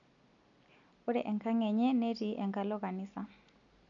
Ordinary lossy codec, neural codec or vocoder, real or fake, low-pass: none; none; real; 7.2 kHz